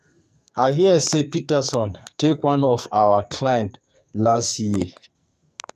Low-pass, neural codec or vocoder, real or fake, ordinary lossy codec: 14.4 kHz; codec, 44.1 kHz, 2.6 kbps, SNAC; fake; none